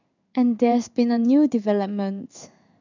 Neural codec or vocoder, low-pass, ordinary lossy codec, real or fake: codec, 16 kHz in and 24 kHz out, 1 kbps, XY-Tokenizer; 7.2 kHz; none; fake